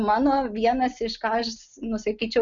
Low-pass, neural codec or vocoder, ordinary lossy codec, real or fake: 7.2 kHz; none; MP3, 96 kbps; real